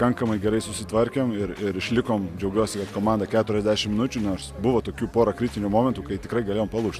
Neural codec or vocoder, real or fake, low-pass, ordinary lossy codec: none; real; 14.4 kHz; Opus, 64 kbps